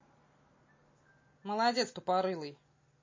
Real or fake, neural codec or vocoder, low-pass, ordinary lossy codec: real; none; 7.2 kHz; MP3, 32 kbps